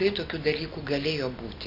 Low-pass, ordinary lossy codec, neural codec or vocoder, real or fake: 5.4 kHz; AAC, 48 kbps; none; real